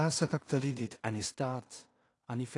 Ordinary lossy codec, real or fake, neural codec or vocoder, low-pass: AAC, 48 kbps; fake; codec, 16 kHz in and 24 kHz out, 0.4 kbps, LongCat-Audio-Codec, two codebook decoder; 10.8 kHz